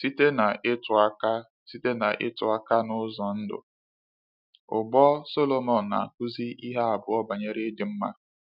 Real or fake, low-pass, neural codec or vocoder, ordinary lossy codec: real; 5.4 kHz; none; none